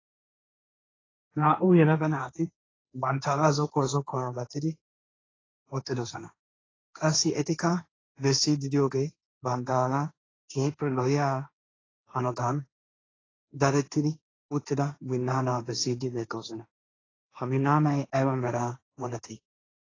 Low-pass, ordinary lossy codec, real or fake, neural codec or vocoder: 7.2 kHz; AAC, 32 kbps; fake; codec, 16 kHz, 1.1 kbps, Voila-Tokenizer